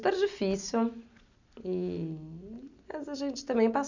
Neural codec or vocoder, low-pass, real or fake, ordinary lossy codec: none; 7.2 kHz; real; none